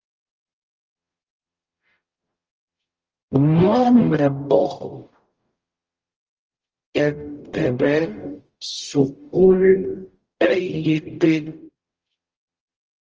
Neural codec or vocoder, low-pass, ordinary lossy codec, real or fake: codec, 44.1 kHz, 0.9 kbps, DAC; 7.2 kHz; Opus, 24 kbps; fake